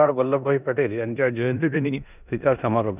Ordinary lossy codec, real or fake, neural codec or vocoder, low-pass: none; fake; codec, 16 kHz in and 24 kHz out, 0.9 kbps, LongCat-Audio-Codec, four codebook decoder; 3.6 kHz